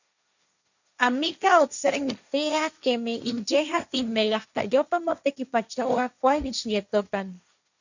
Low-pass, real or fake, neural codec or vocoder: 7.2 kHz; fake; codec, 16 kHz, 1.1 kbps, Voila-Tokenizer